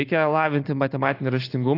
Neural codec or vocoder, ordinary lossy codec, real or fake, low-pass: none; AAC, 32 kbps; real; 5.4 kHz